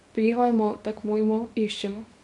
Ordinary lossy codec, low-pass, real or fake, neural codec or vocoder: none; 10.8 kHz; fake; codec, 24 kHz, 0.9 kbps, WavTokenizer, small release